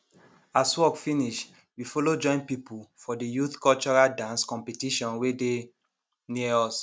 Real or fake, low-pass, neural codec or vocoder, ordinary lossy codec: real; none; none; none